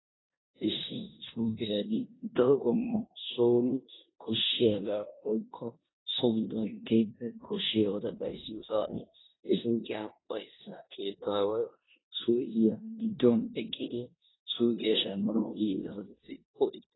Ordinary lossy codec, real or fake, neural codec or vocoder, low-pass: AAC, 16 kbps; fake; codec, 16 kHz in and 24 kHz out, 0.9 kbps, LongCat-Audio-Codec, four codebook decoder; 7.2 kHz